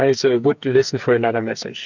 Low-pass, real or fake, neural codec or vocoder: 7.2 kHz; fake; codec, 44.1 kHz, 2.6 kbps, SNAC